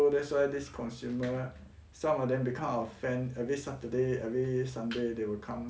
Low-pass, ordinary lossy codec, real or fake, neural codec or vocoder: none; none; real; none